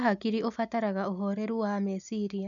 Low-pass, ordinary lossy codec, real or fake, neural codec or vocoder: 7.2 kHz; none; real; none